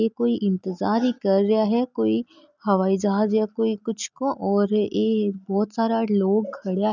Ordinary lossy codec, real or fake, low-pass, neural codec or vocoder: none; real; 7.2 kHz; none